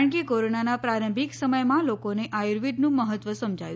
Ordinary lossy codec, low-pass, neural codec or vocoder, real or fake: none; none; none; real